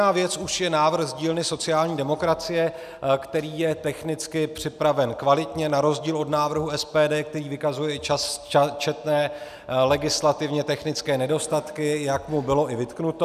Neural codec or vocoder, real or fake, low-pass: none; real; 14.4 kHz